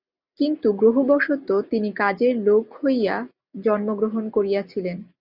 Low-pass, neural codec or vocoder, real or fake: 5.4 kHz; none; real